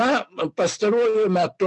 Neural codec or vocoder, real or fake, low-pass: none; real; 10.8 kHz